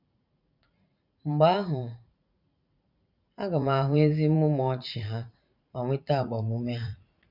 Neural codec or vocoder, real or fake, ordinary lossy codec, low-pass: vocoder, 44.1 kHz, 80 mel bands, Vocos; fake; none; 5.4 kHz